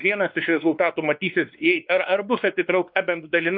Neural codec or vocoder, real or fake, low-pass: codec, 16 kHz, 2 kbps, X-Codec, WavLM features, trained on Multilingual LibriSpeech; fake; 5.4 kHz